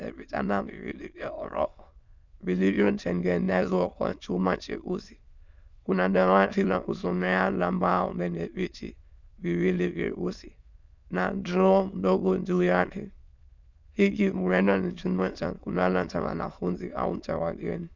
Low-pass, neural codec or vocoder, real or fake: 7.2 kHz; autoencoder, 22.05 kHz, a latent of 192 numbers a frame, VITS, trained on many speakers; fake